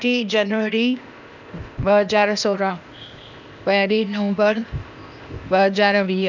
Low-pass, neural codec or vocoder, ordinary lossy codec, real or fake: 7.2 kHz; codec, 16 kHz, 0.8 kbps, ZipCodec; none; fake